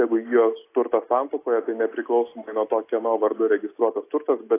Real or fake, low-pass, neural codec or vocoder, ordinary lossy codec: real; 3.6 kHz; none; AAC, 24 kbps